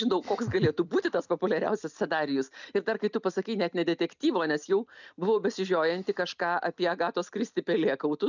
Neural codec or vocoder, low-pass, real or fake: none; 7.2 kHz; real